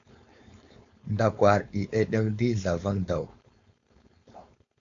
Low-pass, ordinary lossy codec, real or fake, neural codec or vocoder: 7.2 kHz; AAC, 48 kbps; fake; codec, 16 kHz, 4.8 kbps, FACodec